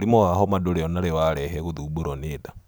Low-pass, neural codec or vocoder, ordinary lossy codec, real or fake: none; none; none; real